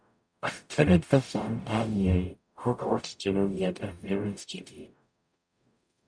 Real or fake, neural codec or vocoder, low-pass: fake; codec, 44.1 kHz, 0.9 kbps, DAC; 9.9 kHz